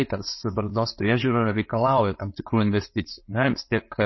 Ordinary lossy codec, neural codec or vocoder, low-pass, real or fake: MP3, 24 kbps; none; 7.2 kHz; real